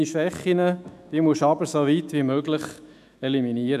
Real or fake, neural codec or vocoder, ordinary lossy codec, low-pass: fake; autoencoder, 48 kHz, 128 numbers a frame, DAC-VAE, trained on Japanese speech; none; 14.4 kHz